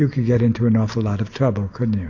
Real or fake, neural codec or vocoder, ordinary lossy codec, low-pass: real; none; AAC, 48 kbps; 7.2 kHz